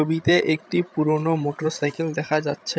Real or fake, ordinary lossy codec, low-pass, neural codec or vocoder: fake; none; none; codec, 16 kHz, 16 kbps, FreqCodec, larger model